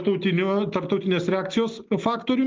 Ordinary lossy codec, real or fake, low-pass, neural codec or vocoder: Opus, 32 kbps; real; 7.2 kHz; none